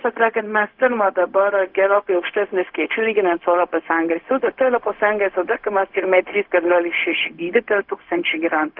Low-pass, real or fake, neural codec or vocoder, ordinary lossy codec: 5.4 kHz; fake; codec, 16 kHz, 0.4 kbps, LongCat-Audio-Codec; Opus, 32 kbps